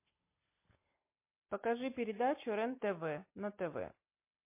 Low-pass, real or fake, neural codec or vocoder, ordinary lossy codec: 3.6 kHz; real; none; MP3, 24 kbps